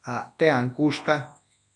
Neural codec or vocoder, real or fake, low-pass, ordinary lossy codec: codec, 24 kHz, 0.9 kbps, WavTokenizer, large speech release; fake; 10.8 kHz; AAC, 64 kbps